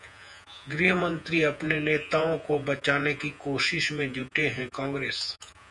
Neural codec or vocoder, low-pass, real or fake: vocoder, 48 kHz, 128 mel bands, Vocos; 10.8 kHz; fake